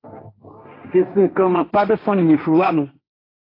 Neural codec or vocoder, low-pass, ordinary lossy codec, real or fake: codec, 16 kHz, 1.1 kbps, Voila-Tokenizer; 5.4 kHz; AAC, 24 kbps; fake